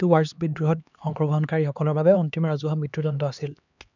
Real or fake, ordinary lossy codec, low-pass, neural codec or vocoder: fake; none; 7.2 kHz; codec, 16 kHz, 2 kbps, X-Codec, HuBERT features, trained on LibriSpeech